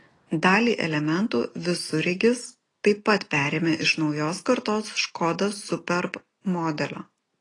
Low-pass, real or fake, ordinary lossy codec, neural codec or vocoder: 10.8 kHz; real; AAC, 32 kbps; none